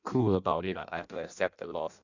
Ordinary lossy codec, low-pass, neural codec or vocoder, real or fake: none; 7.2 kHz; codec, 16 kHz in and 24 kHz out, 0.6 kbps, FireRedTTS-2 codec; fake